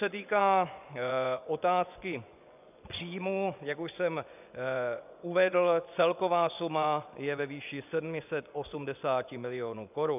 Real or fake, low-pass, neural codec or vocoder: fake; 3.6 kHz; vocoder, 24 kHz, 100 mel bands, Vocos